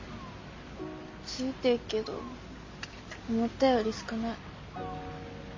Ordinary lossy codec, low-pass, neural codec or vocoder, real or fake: MP3, 32 kbps; 7.2 kHz; none; real